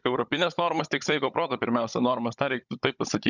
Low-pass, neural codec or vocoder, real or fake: 7.2 kHz; codec, 16 kHz, 16 kbps, FunCodec, trained on Chinese and English, 50 frames a second; fake